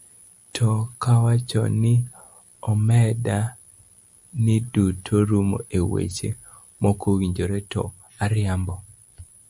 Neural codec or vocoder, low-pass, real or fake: none; 10.8 kHz; real